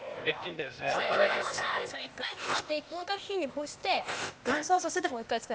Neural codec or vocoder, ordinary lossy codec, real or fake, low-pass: codec, 16 kHz, 0.8 kbps, ZipCodec; none; fake; none